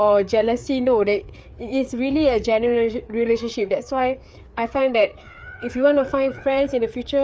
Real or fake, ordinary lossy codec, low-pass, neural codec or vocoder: fake; none; none; codec, 16 kHz, 4 kbps, FreqCodec, larger model